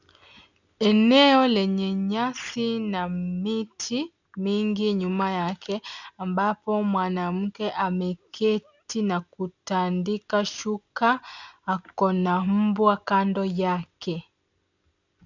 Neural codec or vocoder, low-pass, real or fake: none; 7.2 kHz; real